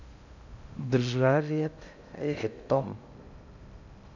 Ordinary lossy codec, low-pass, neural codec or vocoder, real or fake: none; 7.2 kHz; codec, 16 kHz in and 24 kHz out, 0.6 kbps, FocalCodec, streaming, 2048 codes; fake